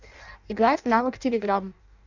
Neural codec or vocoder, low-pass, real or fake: codec, 16 kHz in and 24 kHz out, 0.6 kbps, FireRedTTS-2 codec; 7.2 kHz; fake